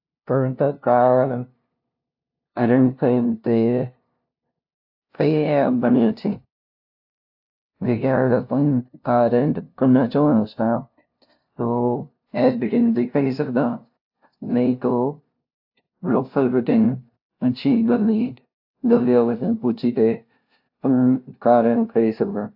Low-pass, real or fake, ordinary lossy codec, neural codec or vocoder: 5.4 kHz; fake; AAC, 48 kbps; codec, 16 kHz, 0.5 kbps, FunCodec, trained on LibriTTS, 25 frames a second